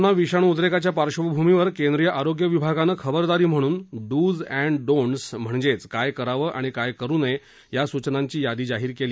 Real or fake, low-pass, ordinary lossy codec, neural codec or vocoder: real; none; none; none